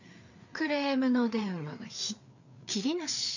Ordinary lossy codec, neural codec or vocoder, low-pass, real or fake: none; codec, 16 kHz, 4 kbps, FreqCodec, larger model; 7.2 kHz; fake